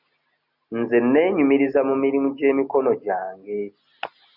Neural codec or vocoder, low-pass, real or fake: none; 5.4 kHz; real